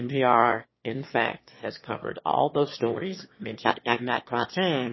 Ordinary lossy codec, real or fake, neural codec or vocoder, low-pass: MP3, 24 kbps; fake; autoencoder, 22.05 kHz, a latent of 192 numbers a frame, VITS, trained on one speaker; 7.2 kHz